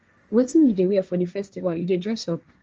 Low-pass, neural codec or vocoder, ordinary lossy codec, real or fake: 7.2 kHz; codec, 16 kHz, 1.1 kbps, Voila-Tokenizer; Opus, 24 kbps; fake